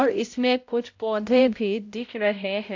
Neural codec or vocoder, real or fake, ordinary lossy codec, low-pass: codec, 16 kHz, 0.5 kbps, X-Codec, HuBERT features, trained on balanced general audio; fake; AAC, 48 kbps; 7.2 kHz